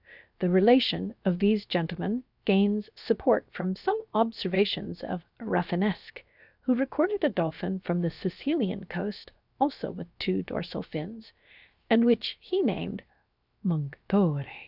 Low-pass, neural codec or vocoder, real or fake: 5.4 kHz; codec, 16 kHz, 0.7 kbps, FocalCodec; fake